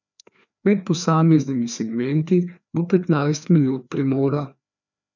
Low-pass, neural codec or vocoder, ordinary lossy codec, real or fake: 7.2 kHz; codec, 16 kHz, 2 kbps, FreqCodec, larger model; none; fake